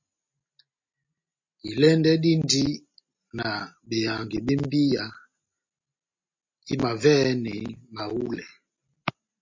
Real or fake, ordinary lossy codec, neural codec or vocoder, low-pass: real; MP3, 32 kbps; none; 7.2 kHz